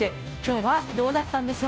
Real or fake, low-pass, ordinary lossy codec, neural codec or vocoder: fake; none; none; codec, 16 kHz, 0.5 kbps, FunCodec, trained on Chinese and English, 25 frames a second